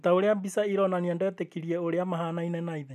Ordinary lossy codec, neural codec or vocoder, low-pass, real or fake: none; none; 14.4 kHz; real